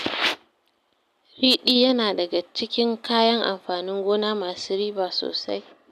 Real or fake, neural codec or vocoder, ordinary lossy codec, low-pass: real; none; none; 14.4 kHz